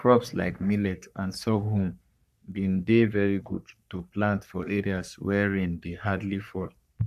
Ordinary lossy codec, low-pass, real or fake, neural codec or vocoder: none; 14.4 kHz; fake; codec, 44.1 kHz, 3.4 kbps, Pupu-Codec